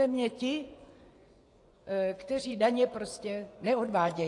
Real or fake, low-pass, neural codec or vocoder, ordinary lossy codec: fake; 10.8 kHz; vocoder, 24 kHz, 100 mel bands, Vocos; AAC, 48 kbps